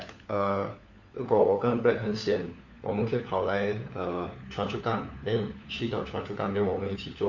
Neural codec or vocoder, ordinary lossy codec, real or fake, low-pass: codec, 16 kHz, 4 kbps, FunCodec, trained on LibriTTS, 50 frames a second; none; fake; 7.2 kHz